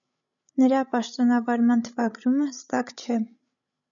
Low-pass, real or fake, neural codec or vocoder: 7.2 kHz; fake; codec, 16 kHz, 16 kbps, FreqCodec, larger model